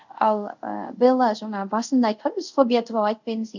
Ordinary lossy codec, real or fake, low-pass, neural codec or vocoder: none; fake; 7.2 kHz; codec, 24 kHz, 0.5 kbps, DualCodec